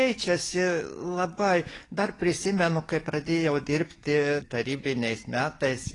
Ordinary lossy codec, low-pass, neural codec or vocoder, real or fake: AAC, 32 kbps; 10.8 kHz; codec, 44.1 kHz, 7.8 kbps, DAC; fake